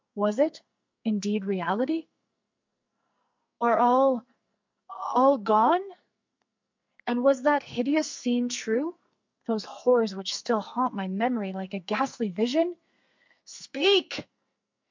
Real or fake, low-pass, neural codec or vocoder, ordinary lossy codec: fake; 7.2 kHz; codec, 44.1 kHz, 2.6 kbps, SNAC; MP3, 64 kbps